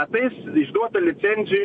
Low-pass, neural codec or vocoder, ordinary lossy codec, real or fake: 9.9 kHz; none; MP3, 48 kbps; real